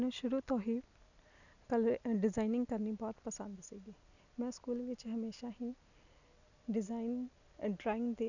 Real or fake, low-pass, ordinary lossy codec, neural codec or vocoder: real; 7.2 kHz; none; none